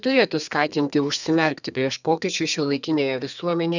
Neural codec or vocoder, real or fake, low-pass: codec, 32 kHz, 1.9 kbps, SNAC; fake; 7.2 kHz